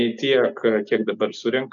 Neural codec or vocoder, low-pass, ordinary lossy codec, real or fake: none; 7.2 kHz; MP3, 96 kbps; real